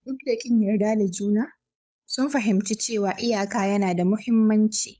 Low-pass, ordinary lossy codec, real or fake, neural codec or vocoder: none; none; fake; codec, 16 kHz, 8 kbps, FunCodec, trained on Chinese and English, 25 frames a second